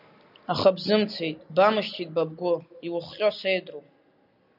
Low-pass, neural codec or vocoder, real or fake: 5.4 kHz; none; real